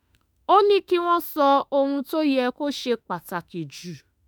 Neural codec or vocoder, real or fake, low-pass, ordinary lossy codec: autoencoder, 48 kHz, 32 numbers a frame, DAC-VAE, trained on Japanese speech; fake; none; none